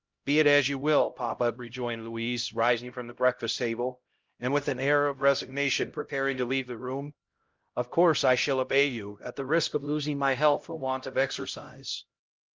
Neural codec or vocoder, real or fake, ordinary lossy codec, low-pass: codec, 16 kHz, 0.5 kbps, X-Codec, HuBERT features, trained on LibriSpeech; fake; Opus, 24 kbps; 7.2 kHz